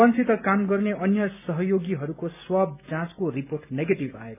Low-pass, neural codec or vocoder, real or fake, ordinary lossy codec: 3.6 kHz; none; real; none